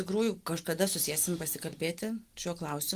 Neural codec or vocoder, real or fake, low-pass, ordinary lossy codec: none; real; 14.4 kHz; Opus, 24 kbps